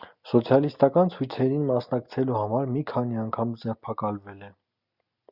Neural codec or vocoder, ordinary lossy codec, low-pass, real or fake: none; Opus, 64 kbps; 5.4 kHz; real